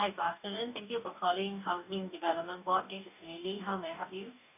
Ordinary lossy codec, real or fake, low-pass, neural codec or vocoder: none; fake; 3.6 kHz; codec, 44.1 kHz, 2.6 kbps, DAC